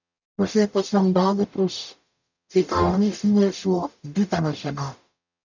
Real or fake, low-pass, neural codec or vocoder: fake; 7.2 kHz; codec, 44.1 kHz, 0.9 kbps, DAC